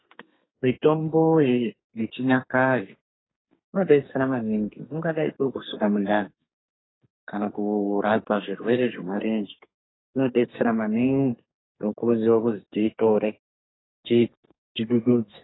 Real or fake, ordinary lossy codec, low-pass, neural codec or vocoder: fake; AAC, 16 kbps; 7.2 kHz; codec, 32 kHz, 1.9 kbps, SNAC